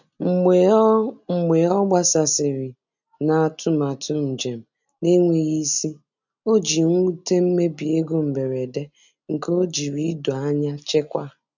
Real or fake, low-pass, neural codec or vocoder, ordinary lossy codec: real; 7.2 kHz; none; none